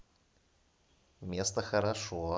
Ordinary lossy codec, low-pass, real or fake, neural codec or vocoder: none; none; real; none